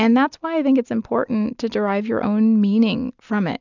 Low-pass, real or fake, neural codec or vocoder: 7.2 kHz; real; none